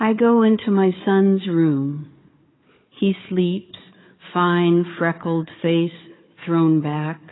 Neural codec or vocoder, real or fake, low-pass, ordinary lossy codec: codec, 16 kHz, 4 kbps, FunCodec, trained on Chinese and English, 50 frames a second; fake; 7.2 kHz; AAC, 16 kbps